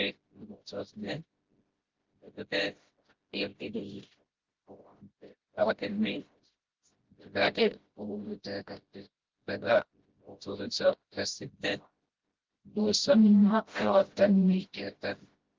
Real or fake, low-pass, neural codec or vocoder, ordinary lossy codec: fake; 7.2 kHz; codec, 16 kHz, 0.5 kbps, FreqCodec, smaller model; Opus, 16 kbps